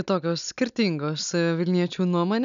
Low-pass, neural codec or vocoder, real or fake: 7.2 kHz; none; real